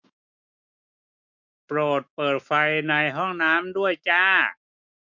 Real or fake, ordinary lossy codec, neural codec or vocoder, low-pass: fake; MP3, 48 kbps; autoencoder, 48 kHz, 128 numbers a frame, DAC-VAE, trained on Japanese speech; 7.2 kHz